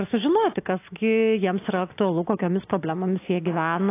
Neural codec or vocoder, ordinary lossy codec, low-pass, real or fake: none; AAC, 24 kbps; 3.6 kHz; real